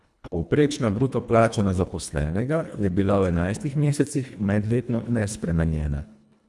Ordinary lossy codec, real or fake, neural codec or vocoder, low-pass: none; fake; codec, 24 kHz, 1.5 kbps, HILCodec; none